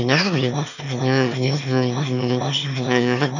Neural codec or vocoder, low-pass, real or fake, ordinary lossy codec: autoencoder, 22.05 kHz, a latent of 192 numbers a frame, VITS, trained on one speaker; 7.2 kHz; fake; none